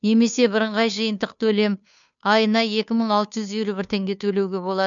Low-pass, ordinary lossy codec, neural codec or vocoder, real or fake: 7.2 kHz; MP3, 96 kbps; codec, 16 kHz, 2 kbps, FunCodec, trained on Chinese and English, 25 frames a second; fake